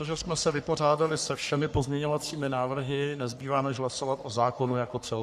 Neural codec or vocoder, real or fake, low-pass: codec, 44.1 kHz, 3.4 kbps, Pupu-Codec; fake; 14.4 kHz